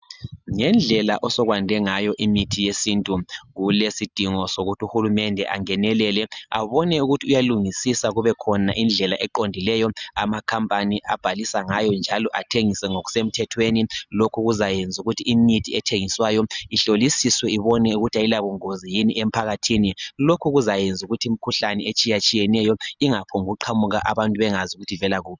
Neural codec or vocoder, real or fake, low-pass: none; real; 7.2 kHz